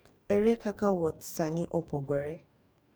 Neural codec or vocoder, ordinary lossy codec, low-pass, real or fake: codec, 44.1 kHz, 2.6 kbps, DAC; none; none; fake